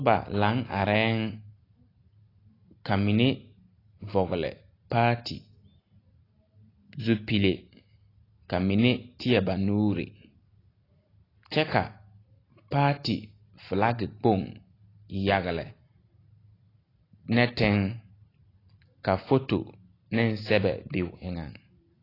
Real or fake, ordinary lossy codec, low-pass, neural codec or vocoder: real; AAC, 24 kbps; 5.4 kHz; none